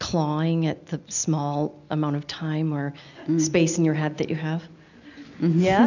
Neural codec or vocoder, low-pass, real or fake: none; 7.2 kHz; real